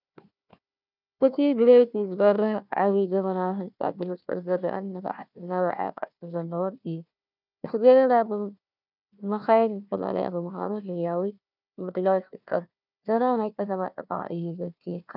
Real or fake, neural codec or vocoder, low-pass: fake; codec, 16 kHz, 1 kbps, FunCodec, trained on Chinese and English, 50 frames a second; 5.4 kHz